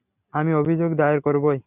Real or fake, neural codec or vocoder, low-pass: real; none; 3.6 kHz